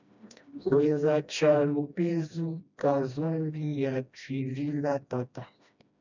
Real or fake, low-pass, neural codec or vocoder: fake; 7.2 kHz; codec, 16 kHz, 1 kbps, FreqCodec, smaller model